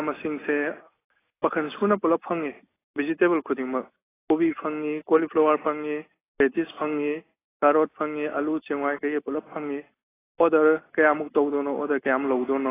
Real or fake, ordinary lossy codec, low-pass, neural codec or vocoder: real; AAC, 16 kbps; 3.6 kHz; none